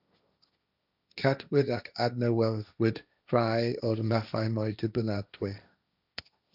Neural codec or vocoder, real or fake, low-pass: codec, 16 kHz, 1.1 kbps, Voila-Tokenizer; fake; 5.4 kHz